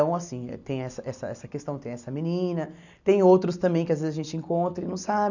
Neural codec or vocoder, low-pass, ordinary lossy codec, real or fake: none; 7.2 kHz; none; real